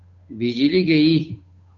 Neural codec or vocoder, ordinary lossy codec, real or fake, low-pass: codec, 16 kHz, 8 kbps, FunCodec, trained on Chinese and English, 25 frames a second; AAC, 48 kbps; fake; 7.2 kHz